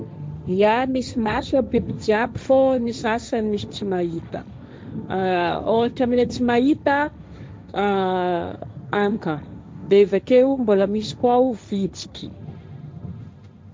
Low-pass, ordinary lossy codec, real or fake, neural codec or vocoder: none; none; fake; codec, 16 kHz, 1.1 kbps, Voila-Tokenizer